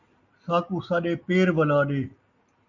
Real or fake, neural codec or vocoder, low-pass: real; none; 7.2 kHz